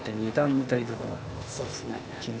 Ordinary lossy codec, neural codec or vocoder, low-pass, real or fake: none; codec, 16 kHz, 0.8 kbps, ZipCodec; none; fake